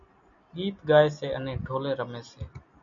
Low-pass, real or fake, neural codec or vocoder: 7.2 kHz; real; none